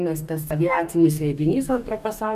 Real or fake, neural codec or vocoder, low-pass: fake; codec, 44.1 kHz, 2.6 kbps, DAC; 14.4 kHz